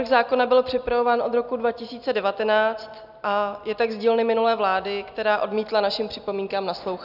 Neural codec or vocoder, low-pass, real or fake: none; 5.4 kHz; real